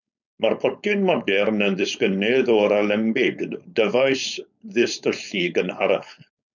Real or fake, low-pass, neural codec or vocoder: fake; 7.2 kHz; codec, 16 kHz, 4.8 kbps, FACodec